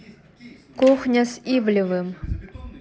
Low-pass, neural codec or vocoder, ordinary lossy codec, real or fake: none; none; none; real